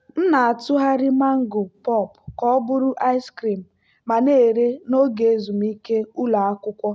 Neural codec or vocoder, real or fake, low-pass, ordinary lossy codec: none; real; none; none